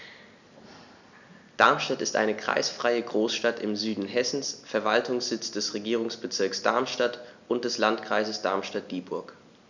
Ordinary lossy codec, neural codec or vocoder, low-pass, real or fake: none; none; 7.2 kHz; real